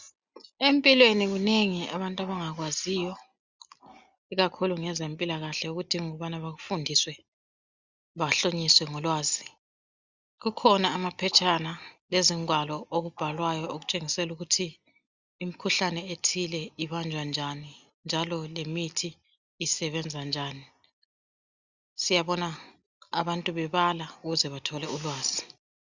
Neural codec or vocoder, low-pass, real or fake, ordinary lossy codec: none; 7.2 kHz; real; Opus, 64 kbps